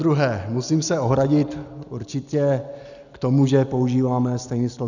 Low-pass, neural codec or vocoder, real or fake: 7.2 kHz; none; real